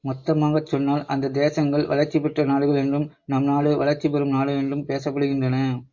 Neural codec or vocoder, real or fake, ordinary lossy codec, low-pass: none; real; MP3, 48 kbps; 7.2 kHz